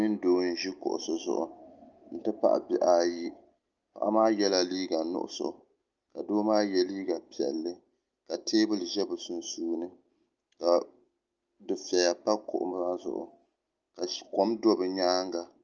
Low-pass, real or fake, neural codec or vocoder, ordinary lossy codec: 7.2 kHz; real; none; Opus, 24 kbps